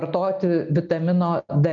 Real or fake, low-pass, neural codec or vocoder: real; 7.2 kHz; none